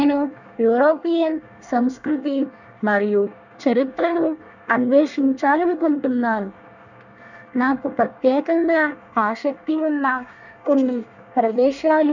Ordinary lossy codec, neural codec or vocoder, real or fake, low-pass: none; codec, 24 kHz, 1 kbps, SNAC; fake; 7.2 kHz